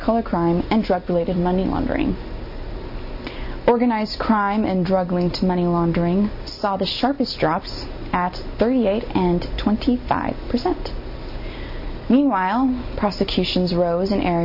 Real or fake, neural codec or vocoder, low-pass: real; none; 5.4 kHz